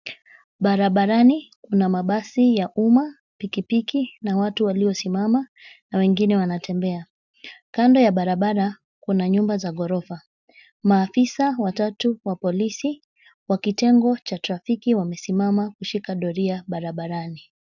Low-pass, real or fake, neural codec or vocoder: 7.2 kHz; real; none